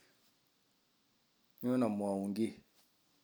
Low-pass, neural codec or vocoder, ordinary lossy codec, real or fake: none; none; none; real